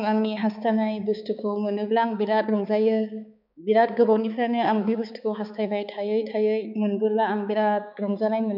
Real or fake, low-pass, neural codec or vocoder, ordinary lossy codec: fake; 5.4 kHz; codec, 16 kHz, 4 kbps, X-Codec, HuBERT features, trained on balanced general audio; none